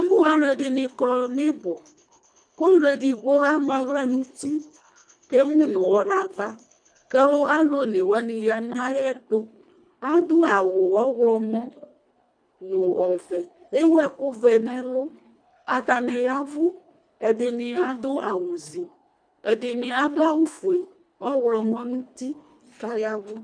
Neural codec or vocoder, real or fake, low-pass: codec, 24 kHz, 1.5 kbps, HILCodec; fake; 9.9 kHz